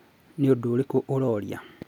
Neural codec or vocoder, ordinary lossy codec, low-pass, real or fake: none; none; 19.8 kHz; real